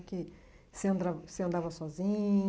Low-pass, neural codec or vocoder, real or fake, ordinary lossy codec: none; none; real; none